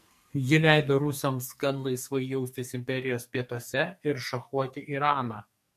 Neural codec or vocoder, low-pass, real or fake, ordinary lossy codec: codec, 44.1 kHz, 2.6 kbps, SNAC; 14.4 kHz; fake; MP3, 64 kbps